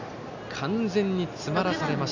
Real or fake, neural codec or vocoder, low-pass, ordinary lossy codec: real; none; 7.2 kHz; none